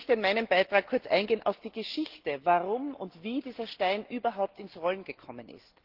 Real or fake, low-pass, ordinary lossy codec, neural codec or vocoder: real; 5.4 kHz; Opus, 16 kbps; none